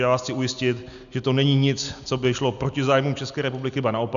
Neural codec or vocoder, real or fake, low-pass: none; real; 7.2 kHz